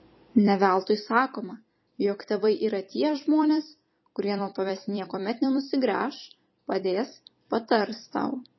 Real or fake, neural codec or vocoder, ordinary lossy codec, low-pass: fake; vocoder, 44.1 kHz, 128 mel bands every 256 samples, BigVGAN v2; MP3, 24 kbps; 7.2 kHz